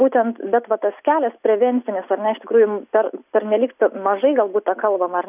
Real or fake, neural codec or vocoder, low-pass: real; none; 3.6 kHz